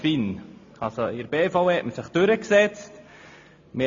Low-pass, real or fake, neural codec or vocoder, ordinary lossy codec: 7.2 kHz; real; none; AAC, 48 kbps